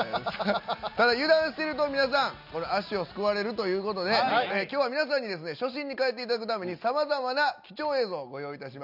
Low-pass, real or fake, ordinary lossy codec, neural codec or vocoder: 5.4 kHz; real; none; none